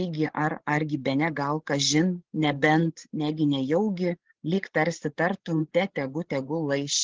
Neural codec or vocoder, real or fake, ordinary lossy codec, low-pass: codec, 16 kHz, 16 kbps, FreqCodec, larger model; fake; Opus, 16 kbps; 7.2 kHz